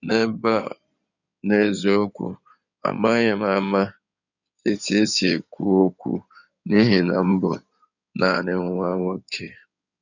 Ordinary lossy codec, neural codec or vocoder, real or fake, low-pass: none; codec, 16 kHz in and 24 kHz out, 2.2 kbps, FireRedTTS-2 codec; fake; 7.2 kHz